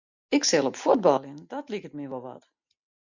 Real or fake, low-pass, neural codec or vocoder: real; 7.2 kHz; none